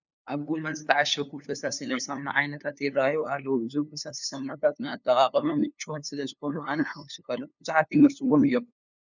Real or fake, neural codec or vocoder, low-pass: fake; codec, 16 kHz, 2 kbps, FunCodec, trained on LibriTTS, 25 frames a second; 7.2 kHz